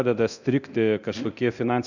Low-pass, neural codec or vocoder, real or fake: 7.2 kHz; codec, 24 kHz, 0.9 kbps, DualCodec; fake